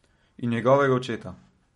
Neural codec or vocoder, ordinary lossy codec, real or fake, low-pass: none; MP3, 48 kbps; real; 19.8 kHz